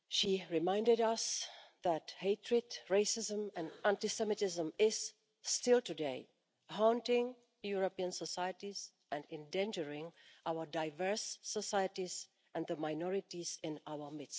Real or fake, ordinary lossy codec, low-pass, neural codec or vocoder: real; none; none; none